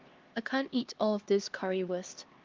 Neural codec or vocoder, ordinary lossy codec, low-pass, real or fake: codec, 16 kHz, 2 kbps, X-Codec, HuBERT features, trained on LibriSpeech; Opus, 32 kbps; 7.2 kHz; fake